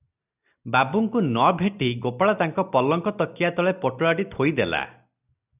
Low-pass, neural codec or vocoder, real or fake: 3.6 kHz; none; real